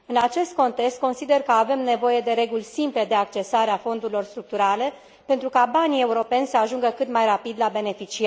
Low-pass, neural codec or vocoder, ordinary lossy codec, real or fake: none; none; none; real